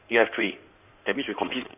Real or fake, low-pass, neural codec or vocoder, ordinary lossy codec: fake; 3.6 kHz; codec, 16 kHz in and 24 kHz out, 2.2 kbps, FireRedTTS-2 codec; none